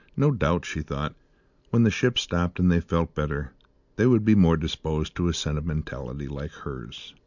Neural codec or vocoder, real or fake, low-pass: none; real; 7.2 kHz